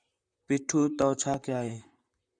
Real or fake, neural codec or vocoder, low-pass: fake; vocoder, 44.1 kHz, 128 mel bands, Pupu-Vocoder; 9.9 kHz